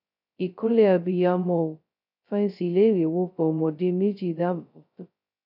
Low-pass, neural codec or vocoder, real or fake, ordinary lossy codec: 5.4 kHz; codec, 16 kHz, 0.2 kbps, FocalCodec; fake; none